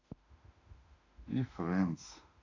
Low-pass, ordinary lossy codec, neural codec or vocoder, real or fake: 7.2 kHz; none; autoencoder, 48 kHz, 32 numbers a frame, DAC-VAE, trained on Japanese speech; fake